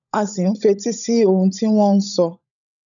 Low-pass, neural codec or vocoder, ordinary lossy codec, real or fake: 7.2 kHz; codec, 16 kHz, 16 kbps, FunCodec, trained on LibriTTS, 50 frames a second; none; fake